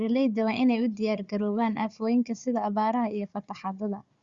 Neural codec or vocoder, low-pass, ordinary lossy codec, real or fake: codec, 16 kHz, 4 kbps, FunCodec, trained on Chinese and English, 50 frames a second; 7.2 kHz; Opus, 64 kbps; fake